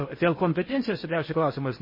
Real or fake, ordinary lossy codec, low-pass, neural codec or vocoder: fake; MP3, 24 kbps; 5.4 kHz; codec, 16 kHz in and 24 kHz out, 0.6 kbps, FocalCodec, streaming, 4096 codes